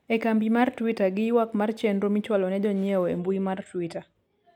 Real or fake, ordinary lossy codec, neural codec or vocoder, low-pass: real; none; none; 19.8 kHz